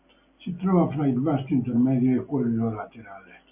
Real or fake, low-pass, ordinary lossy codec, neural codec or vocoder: real; 3.6 kHz; MP3, 32 kbps; none